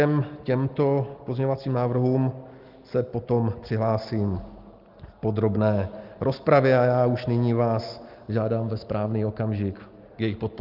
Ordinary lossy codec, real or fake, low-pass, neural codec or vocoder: Opus, 32 kbps; real; 5.4 kHz; none